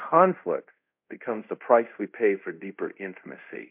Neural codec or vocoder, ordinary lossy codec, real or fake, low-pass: codec, 24 kHz, 0.5 kbps, DualCodec; MP3, 32 kbps; fake; 3.6 kHz